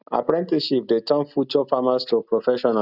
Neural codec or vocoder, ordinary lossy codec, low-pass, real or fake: none; none; 5.4 kHz; real